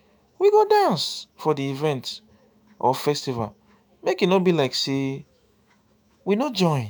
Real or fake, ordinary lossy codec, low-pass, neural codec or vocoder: fake; none; none; autoencoder, 48 kHz, 128 numbers a frame, DAC-VAE, trained on Japanese speech